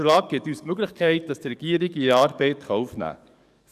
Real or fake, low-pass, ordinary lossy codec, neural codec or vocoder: fake; 14.4 kHz; none; codec, 44.1 kHz, 7.8 kbps, DAC